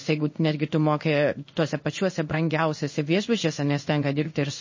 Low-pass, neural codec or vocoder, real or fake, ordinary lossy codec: 7.2 kHz; codec, 16 kHz in and 24 kHz out, 1 kbps, XY-Tokenizer; fake; MP3, 32 kbps